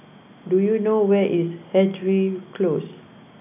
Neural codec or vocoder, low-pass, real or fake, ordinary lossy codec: none; 3.6 kHz; real; none